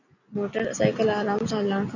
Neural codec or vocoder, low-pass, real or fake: none; 7.2 kHz; real